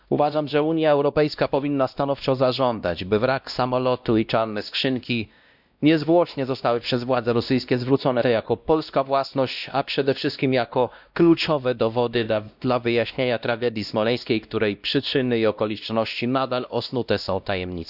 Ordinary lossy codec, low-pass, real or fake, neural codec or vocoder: none; 5.4 kHz; fake; codec, 16 kHz, 1 kbps, X-Codec, WavLM features, trained on Multilingual LibriSpeech